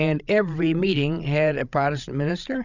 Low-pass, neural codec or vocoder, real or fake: 7.2 kHz; codec, 16 kHz, 16 kbps, FreqCodec, larger model; fake